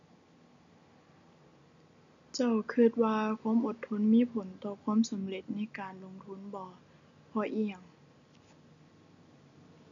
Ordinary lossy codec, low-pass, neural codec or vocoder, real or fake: none; 7.2 kHz; none; real